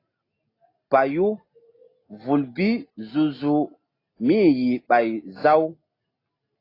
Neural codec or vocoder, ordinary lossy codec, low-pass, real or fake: none; AAC, 24 kbps; 5.4 kHz; real